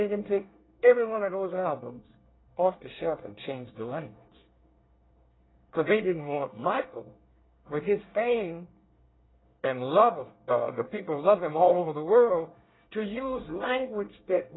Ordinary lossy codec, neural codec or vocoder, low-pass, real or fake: AAC, 16 kbps; codec, 24 kHz, 1 kbps, SNAC; 7.2 kHz; fake